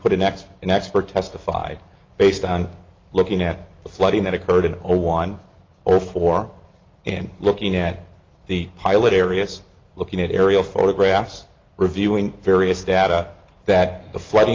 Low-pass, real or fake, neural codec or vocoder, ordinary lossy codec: 7.2 kHz; real; none; Opus, 24 kbps